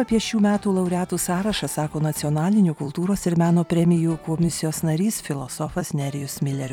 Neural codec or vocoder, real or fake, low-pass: vocoder, 44.1 kHz, 128 mel bands every 256 samples, BigVGAN v2; fake; 19.8 kHz